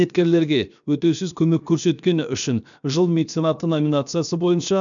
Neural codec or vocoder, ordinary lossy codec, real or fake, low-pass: codec, 16 kHz, 0.7 kbps, FocalCodec; none; fake; 7.2 kHz